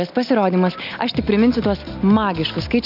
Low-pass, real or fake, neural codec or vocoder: 5.4 kHz; real; none